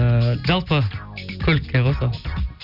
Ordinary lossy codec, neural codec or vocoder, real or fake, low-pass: none; none; real; 5.4 kHz